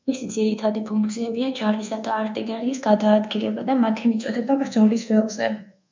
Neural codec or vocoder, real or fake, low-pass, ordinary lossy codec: codec, 24 kHz, 1.2 kbps, DualCodec; fake; 7.2 kHz; MP3, 64 kbps